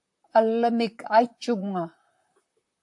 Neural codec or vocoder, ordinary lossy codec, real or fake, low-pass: vocoder, 44.1 kHz, 128 mel bands, Pupu-Vocoder; AAC, 64 kbps; fake; 10.8 kHz